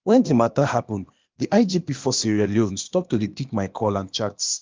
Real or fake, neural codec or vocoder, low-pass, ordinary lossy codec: fake; codec, 16 kHz, 0.8 kbps, ZipCodec; 7.2 kHz; Opus, 24 kbps